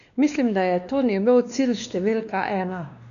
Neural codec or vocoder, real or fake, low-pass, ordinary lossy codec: codec, 16 kHz, 2 kbps, X-Codec, WavLM features, trained on Multilingual LibriSpeech; fake; 7.2 kHz; none